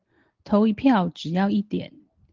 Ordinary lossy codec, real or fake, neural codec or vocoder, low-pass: Opus, 16 kbps; real; none; 7.2 kHz